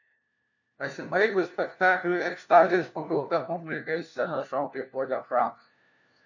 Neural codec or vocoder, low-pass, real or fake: codec, 16 kHz, 1 kbps, FunCodec, trained on LibriTTS, 50 frames a second; 7.2 kHz; fake